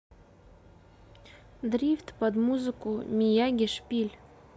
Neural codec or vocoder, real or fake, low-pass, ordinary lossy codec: none; real; none; none